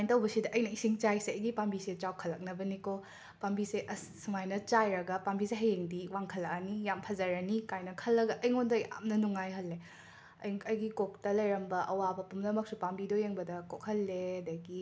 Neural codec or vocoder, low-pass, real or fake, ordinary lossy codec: none; none; real; none